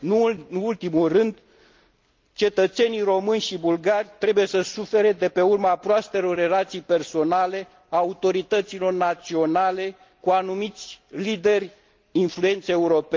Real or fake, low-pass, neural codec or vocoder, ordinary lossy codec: real; 7.2 kHz; none; Opus, 24 kbps